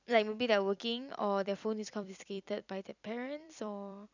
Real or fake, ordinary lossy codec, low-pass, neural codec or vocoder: fake; none; 7.2 kHz; vocoder, 44.1 kHz, 128 mel bands every 256 samples, BigVGAN v2